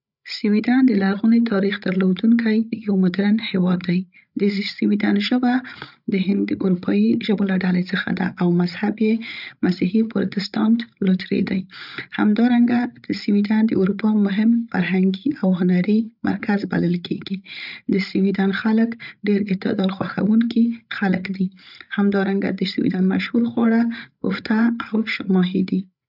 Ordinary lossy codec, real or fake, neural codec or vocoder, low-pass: none; fake; codec, 16 kHz, 16 kbps, FreqCodec, larger model; 5.4 kHz